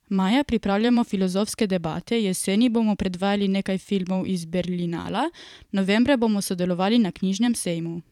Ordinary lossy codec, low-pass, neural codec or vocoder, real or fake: none; 19.8 kHz; vocoder, 44.1 kHz, 128 mel bands every 512 samples, BigVGAN v2; fake